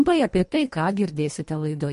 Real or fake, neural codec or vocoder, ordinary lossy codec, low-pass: fake; codec, 24 kHz, 1.5 kbps, HILCodec; MP3, 48 kbps; 10.8 kHz